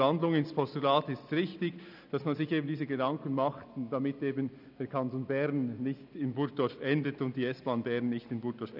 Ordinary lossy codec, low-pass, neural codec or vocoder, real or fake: none; 5.4 kHz; none; real